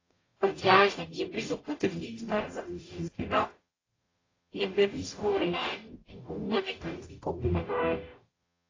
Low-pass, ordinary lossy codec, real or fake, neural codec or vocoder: 7.2 kHz; AAC, 32 kbps; fake; codec, 44.1 kHz, 0.9 kbps, DAC